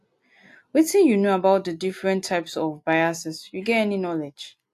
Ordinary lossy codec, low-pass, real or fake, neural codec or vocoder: AAC, 64 kbps; 14.4 kHz; real; none